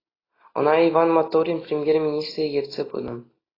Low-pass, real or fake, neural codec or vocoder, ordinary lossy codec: 5.4 kHz; real; none; AAC, 24 kbps